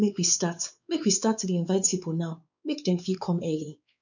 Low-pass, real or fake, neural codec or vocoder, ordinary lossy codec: 7.2 kHz; fake; codec, 16 kHz, 4 kbps, X-Codec, WavLM features, trained on Multilingual LibriSpeech; none